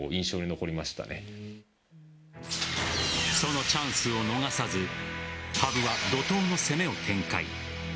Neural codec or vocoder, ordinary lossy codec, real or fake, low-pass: none; none; real; none